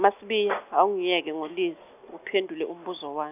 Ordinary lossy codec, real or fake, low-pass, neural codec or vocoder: none; real; 3.6 kHz; none